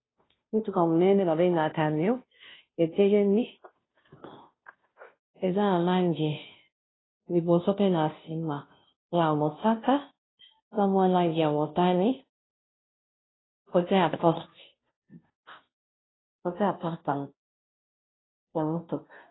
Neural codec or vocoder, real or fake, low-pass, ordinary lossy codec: codec, 16 kHz, 0.5 kbps, FunCodec, trained on Chinese and English, 25 frames a second; fake; 7.2 kHz; AAC, 16 kbps